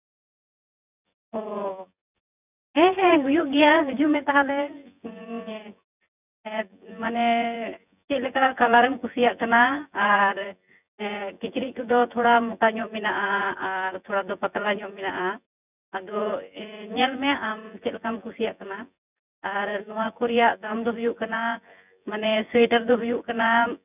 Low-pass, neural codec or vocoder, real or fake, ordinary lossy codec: 3.6 kHz; vocoder, 24 kHz, 100 mel bands, Vocos; fake; none